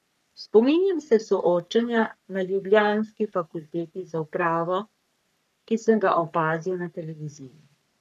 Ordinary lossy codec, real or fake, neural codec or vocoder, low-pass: none; fake; codec, 44.1 kHz, 3.4 kbps, Pupu-Codec; 14.4 kHz